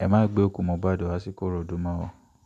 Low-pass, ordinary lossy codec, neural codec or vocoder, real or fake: 14.4 kHz; none; none; real